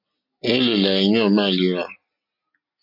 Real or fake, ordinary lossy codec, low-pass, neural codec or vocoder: real; MP3, 32 kbps; 5.4 kHz; none